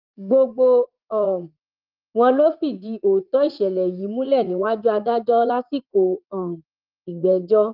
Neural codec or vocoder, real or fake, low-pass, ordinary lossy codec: vocoder, 44.1 kHz, 80 mel bands, Vocos; fake; 5.4 kHz; Opus, 32 kbps